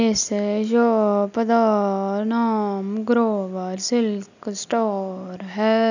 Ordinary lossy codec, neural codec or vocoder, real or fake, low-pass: none; none; real; 7.2 kHz